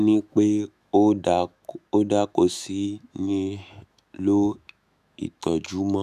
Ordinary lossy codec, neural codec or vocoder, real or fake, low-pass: none; autoencoder, 48 kHz, 128 numbers a frame, DAC-VAE, trained on Japanese speech; fake; 14.4 kHz